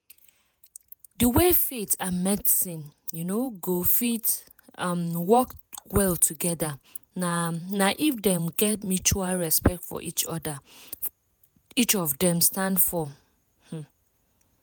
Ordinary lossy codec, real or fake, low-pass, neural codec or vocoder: none; fake; none; vocoder, 48 kHz, 128 mel bands, Vocos